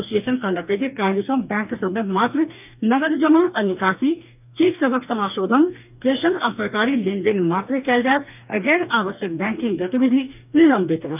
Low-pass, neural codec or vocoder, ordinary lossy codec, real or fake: 3.6 kHz; codec, 44.1 kHz, 2.6 kbps, DAC; none; fake